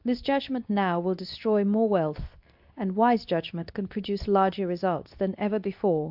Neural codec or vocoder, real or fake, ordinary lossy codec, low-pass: codec, 24 kHz, 0.9 kbps, WavTokenizer, medium speech release version 2; fake; AAC, 48 kbps; 5.4 kHz